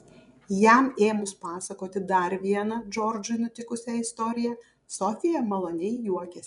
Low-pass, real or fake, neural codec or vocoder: 10.8 kHz; fake; vocoder, 24 kHz, 100 mel bands, Vocos